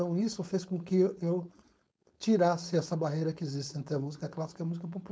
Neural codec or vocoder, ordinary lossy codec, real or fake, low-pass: codec, 16 kHz, 4.8 kbps, FACodec; none; fake; none